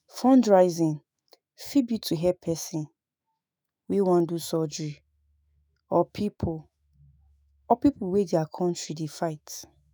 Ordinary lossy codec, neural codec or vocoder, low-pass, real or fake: none; autoencoder, 48 kHz, 128 numbers a frame, DAC-VAE, trained on Japanese speech; none; fake